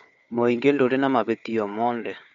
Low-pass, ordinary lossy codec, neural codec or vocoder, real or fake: 7.2 kHz; none; codec, 16 kHz, 4 kbps, FunCodec, trained on Chinese and English, 50 frames a second; fake